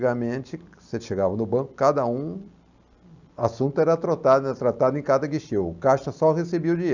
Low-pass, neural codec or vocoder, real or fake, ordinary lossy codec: 7.2 kHz; none; real; none